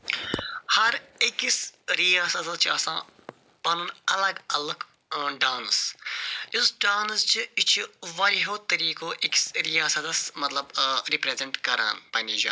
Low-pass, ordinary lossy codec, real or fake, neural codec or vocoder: none; none; real; none